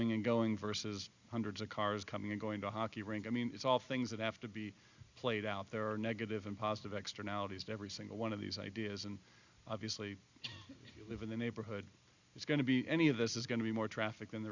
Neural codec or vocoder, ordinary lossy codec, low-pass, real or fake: none; Opus, 64 kbps; 7.2 kHz; real